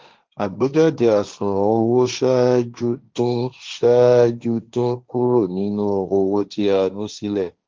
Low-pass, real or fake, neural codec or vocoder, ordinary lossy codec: 7.2 kHz; fake; codec, 16 kHz, 1.1 kbps, Voila-Tokenizer; Opus, 32 kbps